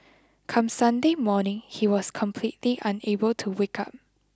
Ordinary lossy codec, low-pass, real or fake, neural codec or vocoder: none; none; real; none